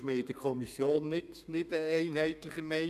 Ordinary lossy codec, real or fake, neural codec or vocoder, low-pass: none; fake; codec, 32 kHz, 1.9 kbps, SNAC; 14.4 kHz